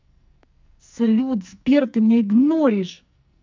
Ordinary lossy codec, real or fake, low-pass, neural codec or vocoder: MP3, 64 kbps; fake; 7.2 kHz; codec, 44.1 kHz, 2.6 kbps, SNAC